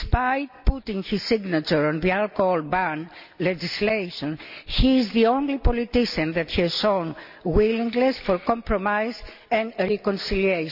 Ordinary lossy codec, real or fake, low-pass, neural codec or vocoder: none; real; 5.4 kHz; none